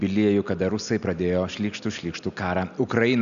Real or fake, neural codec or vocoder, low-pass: real; none; 7.2 kHz